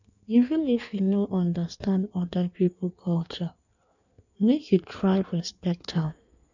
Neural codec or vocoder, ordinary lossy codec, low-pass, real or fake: codec, 16 kHz in and 24 kHz out, 1.1 kbps, FireRedTTS-2 codec; none; 7.2 kHz; fake